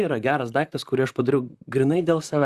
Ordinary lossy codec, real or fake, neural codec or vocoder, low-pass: Opus, 64 kbps; fake; vocoder, 44.1 kHz, 128 mel bands, Pupu-Vocoder; 14.4 kHz